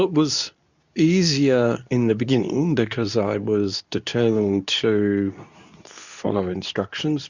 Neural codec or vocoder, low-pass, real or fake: codec, 24 kHz, 0.9 kbps, WavTokenizer, medium speech release version 2; 7.2 kHz; fake